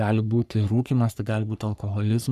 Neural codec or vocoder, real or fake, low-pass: codec, 44.1 kHz, 3.4 kbps, Pupu-Codec; fake; 14.4 kHz